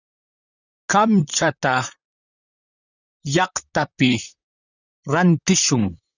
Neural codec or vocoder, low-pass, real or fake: vocoder, 44.1 kHz, 128 mel bands, Pupu-Vocoder; 7.2 kHz; fake